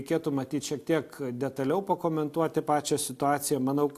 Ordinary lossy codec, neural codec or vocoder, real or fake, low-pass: AAC, 64 kbps; none; real; 14.4 kHz